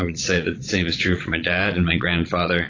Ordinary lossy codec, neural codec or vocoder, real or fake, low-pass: AAC, 32 kbps; vocoder, 22.05 kHz, 80 mel bands, Vocos; fake; 7.2 kHz